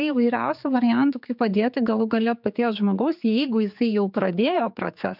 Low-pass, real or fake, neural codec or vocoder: 5.4 kHz; fake; codec, 16 kHz, 4 kbps, X-Codec, HuBERT features, trained on general audio